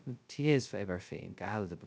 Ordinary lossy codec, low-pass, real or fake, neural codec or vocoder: none; none; fake; codec, 16 kHz, 0.2 kbps, FocalCodec